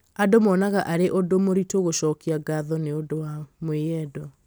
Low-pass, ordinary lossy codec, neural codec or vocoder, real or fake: none; none; none; real